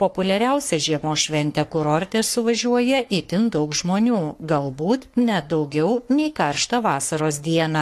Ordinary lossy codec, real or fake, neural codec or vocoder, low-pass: AAC, 64 kbps; fake; codec, 44.1 kHz, 3.4 kbps, Pupu-Codec; 14.4 kHz